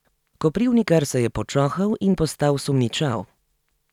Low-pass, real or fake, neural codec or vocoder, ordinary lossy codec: 19.8 kHz; real; none; none